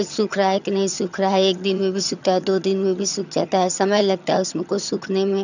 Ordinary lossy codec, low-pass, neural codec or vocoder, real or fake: none; 7.2 kHz; vocoder, 22.05 kHz, 80 mel bands, HiFi-GAN; fake